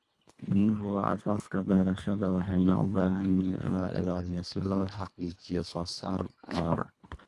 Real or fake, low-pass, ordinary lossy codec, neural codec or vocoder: fake; none; none; codec, 24 kHz, 1.5 kbps, HILCodec